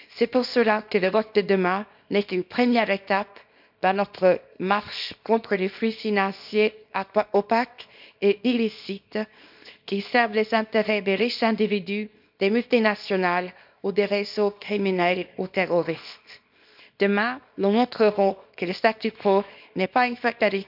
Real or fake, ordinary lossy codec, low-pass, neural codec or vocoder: fake; none; 5.4 kHz; codec, 24 kHz, 0.9 kbps, WavTokenizer, small release